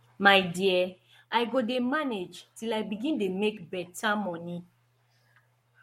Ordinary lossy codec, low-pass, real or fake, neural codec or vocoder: MP3, 64 kbps; 19.8 kHz; fake; codec, 44.1 kHz, 7.8 kbps, Pupu-Codec